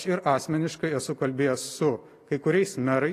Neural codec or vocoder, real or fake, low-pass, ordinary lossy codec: none; real; 14.4 kHz; AAC, 48 kbps